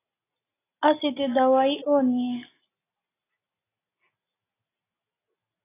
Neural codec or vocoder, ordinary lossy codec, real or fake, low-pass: none; AAC, 16 kbps; real; 3.6 kHz